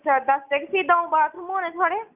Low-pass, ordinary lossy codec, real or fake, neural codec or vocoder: 3.6 kHz; none; real; none